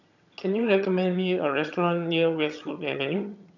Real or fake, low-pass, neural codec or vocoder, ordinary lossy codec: fake; 7.2 kHz; vocoder, 22.05 kHz, 80 mel bands, HiFi-GAN; none